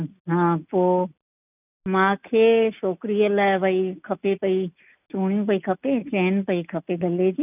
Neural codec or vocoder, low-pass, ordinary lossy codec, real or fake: none; 3.6 kHz; none; real